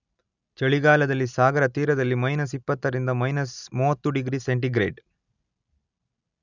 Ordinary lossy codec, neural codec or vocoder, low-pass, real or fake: none; none; 7.2 kHz; real